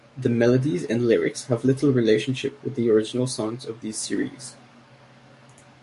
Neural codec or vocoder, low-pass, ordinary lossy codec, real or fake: codec, 44.1 kHz, 7.8 kbps, DAC; 14.4 kHz; MP3, 48 kbps; fake